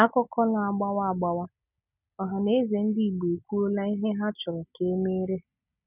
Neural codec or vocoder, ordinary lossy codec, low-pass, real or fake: none; none; 3.6 kHz; real